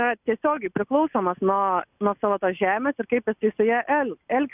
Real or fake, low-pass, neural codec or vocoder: real; 3.6 kHz; none